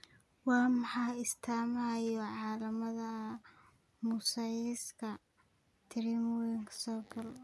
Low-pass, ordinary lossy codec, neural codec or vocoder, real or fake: none; none; none; real